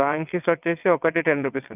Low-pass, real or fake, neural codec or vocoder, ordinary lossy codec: 3.6 kHz; fake; vocoder, 22.05 kHz, 80 mel bands, WaveNeXt; none